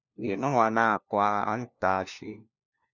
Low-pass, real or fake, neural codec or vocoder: 7.2 kHz; fake; codec, 16 kHz, 1 kbps, FunCodec, trained on LibriTTS, 50 frames a second